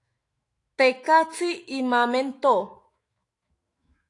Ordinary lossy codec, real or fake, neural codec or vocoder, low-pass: AAC, 48 kbps; fake; autoencoder, 48 kHz, 128 numbers a frame, DAC-VAE, trained on Japanese speech; 10.8 kHz